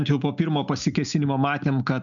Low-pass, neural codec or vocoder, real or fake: 7.2 kHz; none; real